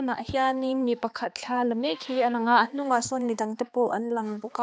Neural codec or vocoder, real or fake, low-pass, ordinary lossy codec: codec, 16 kHz, 2 kbps, X-Codec, HuBERT features, trained on balanced general audio; fake; none; none